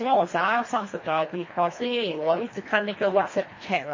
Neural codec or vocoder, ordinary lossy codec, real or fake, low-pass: codec, 24 kHz, 1.5 kbps, HILCodec; MP3, 32 kbps; fake; 7.2 kHz